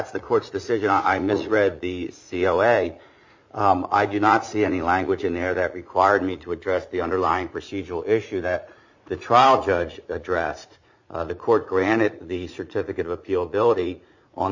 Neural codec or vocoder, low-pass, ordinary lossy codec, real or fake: vocoder, 44.1 kHz, 80 mel bands, Vocos; 7.2 kHz; MP3, 48 kbps; fake